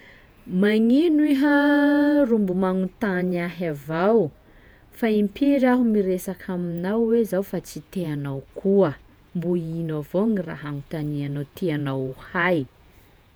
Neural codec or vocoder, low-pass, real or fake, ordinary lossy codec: vocoder, 48 kHz, 128 mel bands, Vocos; none; fake; none